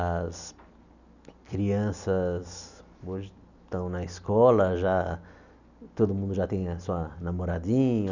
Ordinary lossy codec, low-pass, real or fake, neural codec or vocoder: none; 7.2 kHz; real; none